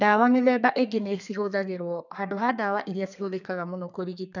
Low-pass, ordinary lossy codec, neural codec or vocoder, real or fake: 7.2 kHz; none; codec, 32 kHz, 1.9 kbps, SNAC; fake